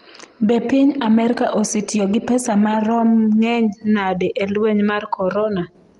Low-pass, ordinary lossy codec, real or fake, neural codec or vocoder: 10.8 kHz; Opus, 32 kbps; real; none